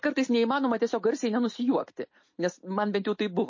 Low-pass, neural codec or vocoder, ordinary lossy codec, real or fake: 7.2 kHz; none; MP3, 32 kbps; real